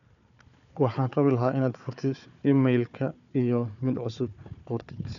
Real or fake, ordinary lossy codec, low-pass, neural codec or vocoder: fake; none; 7.2 kHz; codec, 16 kHz, 4 kbps, FunCodec, trained on Chinese and English, 50 frames a second